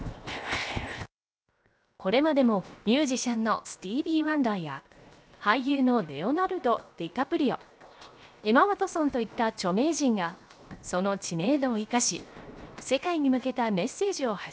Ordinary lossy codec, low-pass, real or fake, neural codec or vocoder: none; none; fake; codec, 16 kHz, 0.7 kbps, FocalCodec